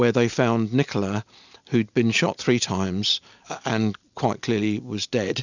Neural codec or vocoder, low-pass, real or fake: none; 7.2 kHz; real